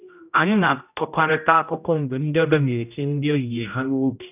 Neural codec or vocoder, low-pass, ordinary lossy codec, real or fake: codec, 16 kHz, 0.5 kbps, X-Codec, HuBERT features, trained on general audio; 3.6 kHz; none; fake